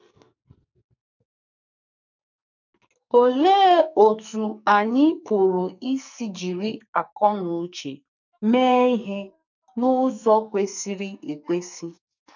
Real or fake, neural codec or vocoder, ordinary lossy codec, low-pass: fake; codec, 44.1 kHz, 2.6 kbps, SNAC; none; 7.2 kHz